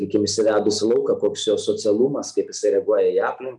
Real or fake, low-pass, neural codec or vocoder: real; 10.8 kHz; none